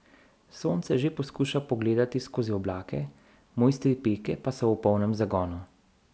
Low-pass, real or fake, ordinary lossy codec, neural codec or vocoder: none; real; none; none